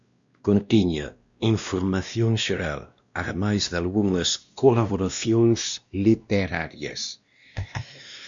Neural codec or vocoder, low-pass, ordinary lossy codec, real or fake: codec, 16 kHz, 1 kbps, X-Codec, WavLM features, trained on Multilingual LibriSpeech; 7.2 kHz; Opus, 64 kbps; fake